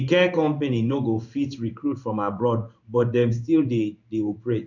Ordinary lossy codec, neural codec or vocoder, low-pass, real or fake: none; codec, 16 kHz in and 24 kHz out, 1 kbps, XY-Tokenizer; 7.2 kHz; fake